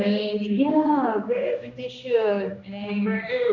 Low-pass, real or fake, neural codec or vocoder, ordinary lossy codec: 7.2 kHz; fake; codec, 16 kHz, 1 kbps, X-Codec, HuBERT features, trained on general audio; none